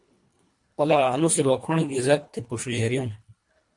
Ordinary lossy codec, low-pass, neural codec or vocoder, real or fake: MP3, 48 kbps; 10.8 kHz; codec, 24 kHz, 1.5 kbps, HILCodec; fake